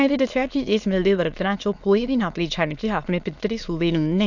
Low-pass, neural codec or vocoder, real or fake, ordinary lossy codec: 7.2 kHz; autoencoder, 22.05 kHz, a latent of 192 numbers a frame, VITS, trained on many speakers; fake; none